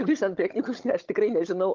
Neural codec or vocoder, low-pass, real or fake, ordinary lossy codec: codec, 16 kHz, 16 kbps, FunCodec, trained on Chinese and English, 50 frames a second; 7.2 kHz; fake; Opus, 32 kbps